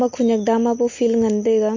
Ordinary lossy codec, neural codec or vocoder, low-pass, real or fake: MP3, 32 kbps; none; 7.2 kHz; real